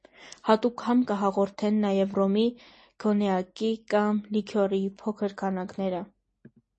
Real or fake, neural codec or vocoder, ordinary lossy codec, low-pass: real; none; MP3, 32 kbps; 10.8 kHz